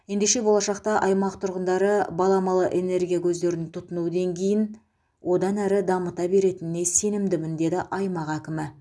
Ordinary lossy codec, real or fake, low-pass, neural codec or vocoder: none; real; none; none